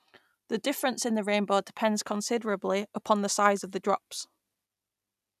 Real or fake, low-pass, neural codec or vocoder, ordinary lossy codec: real; 14.4 kHz; none; none